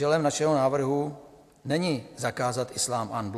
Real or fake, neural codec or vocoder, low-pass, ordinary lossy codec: real; none; 14.4 kHz; AAC, 64 kbps